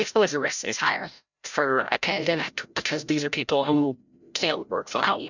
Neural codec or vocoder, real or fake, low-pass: codec, 16 kHz, 0.5 kbps, FreqCodec, larger model; fake; 7.2 kHz